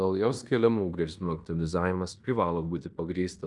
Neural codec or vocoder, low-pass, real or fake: codec, 16 kHz in and 24 kHz out, 0.9 kbps, LongCat-Audio-Codec, fine tuned four codebook decoder; 10.8 kHz; fake